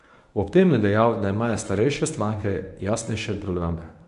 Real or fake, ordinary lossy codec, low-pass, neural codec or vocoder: fake; none; 10.8 kHz; codec, 24 kHz, 0.9 kbps, WavTokenizer, medium speech release version 1